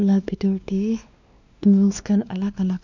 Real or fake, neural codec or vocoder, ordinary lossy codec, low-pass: fake; codec, 16 kHz, 2 kbps, X-Codec, WavLM features, trained on Multilingual LibriSpeech; none; 7.2 kHz